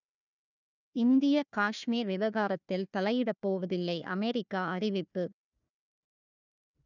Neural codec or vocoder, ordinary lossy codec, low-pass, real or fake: codec, 16 kHz, 1 kbps, FunCodec, trained on Chinese and English, 50 frames a second; none; 7.2 kHz; fake